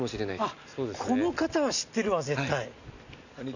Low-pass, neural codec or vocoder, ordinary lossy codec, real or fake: 7.2 kHz; none; none; real